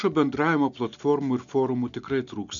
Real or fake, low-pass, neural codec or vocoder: real; 7.2 kHz; none